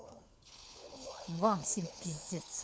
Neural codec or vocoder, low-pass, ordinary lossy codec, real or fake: codec, 16 kHz, 4 kbps, FunCodec, trained on LibriTTS, 50 frames a second; none; none; fake